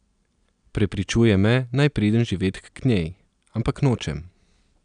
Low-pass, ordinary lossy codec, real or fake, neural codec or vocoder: 9.9 kHz; none; real; none